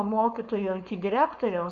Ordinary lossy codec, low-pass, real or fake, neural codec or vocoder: MP3, 96 kbps; 7.2 kHz; fake; codec, 16 kHz, 4.8 kbps, FACodec